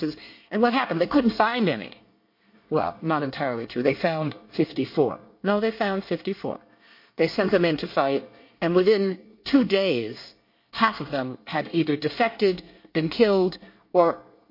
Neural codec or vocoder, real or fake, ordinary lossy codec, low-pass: codec, 24 kHz, 1 kbps, SNAC; fake; MP3, 32 kbps; 5.4 kHz